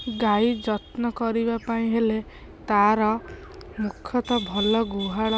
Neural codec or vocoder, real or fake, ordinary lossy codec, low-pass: none; real; none; none